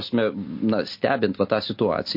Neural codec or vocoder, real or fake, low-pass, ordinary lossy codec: none; real; 5.4 kHz; MP3, 32 kbps